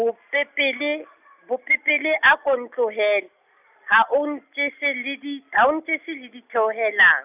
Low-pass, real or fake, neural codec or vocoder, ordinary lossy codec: 3.6 kHz; real; none; none